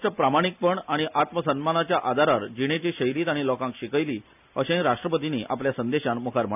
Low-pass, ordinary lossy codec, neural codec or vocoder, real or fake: 3.6 kHz; none; none; real